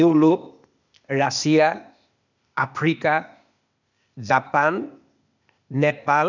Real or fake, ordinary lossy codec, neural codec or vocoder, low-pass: fake; none; codec, 16 kHz, 0.8 kbps, ZipCodec; 7.2 kHz